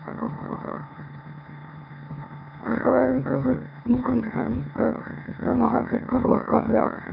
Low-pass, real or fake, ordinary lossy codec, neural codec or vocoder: 5.4 kHz; fake; none; autoencoder, 44.1 kHz, a latent of 192 numbers a frame, MeloTTS